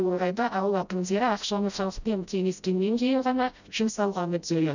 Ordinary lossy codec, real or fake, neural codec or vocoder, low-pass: none; fake; codec, 16 kHz, 0.5 kbps, FreqCodec, smaller model; 7.2 kHz